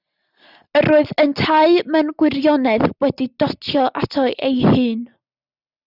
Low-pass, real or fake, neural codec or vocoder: 5.4 kHz; real; none